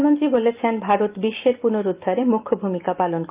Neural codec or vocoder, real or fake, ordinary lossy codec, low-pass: none; real; Opus, 24 kbps; 3.6 kHz